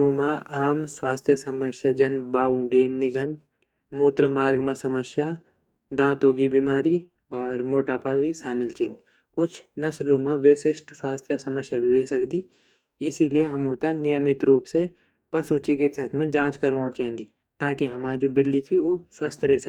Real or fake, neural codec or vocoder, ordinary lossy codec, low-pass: fake; codec, 44.1 kHz, 2.6 kbps, DAC; none; 19.8 kHz